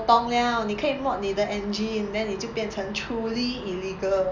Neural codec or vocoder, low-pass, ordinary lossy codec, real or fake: none; 7.2 kHz; none; real